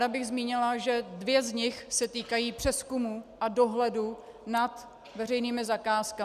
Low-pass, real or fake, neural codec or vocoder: 14.4 kHz; real; none